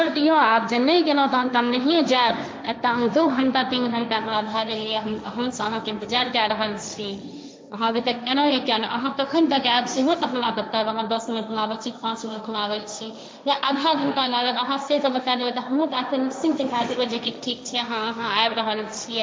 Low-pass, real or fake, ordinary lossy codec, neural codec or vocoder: none; fake; none; codec, 16 kHz, 1.1 kbps, Voila-Tokenizer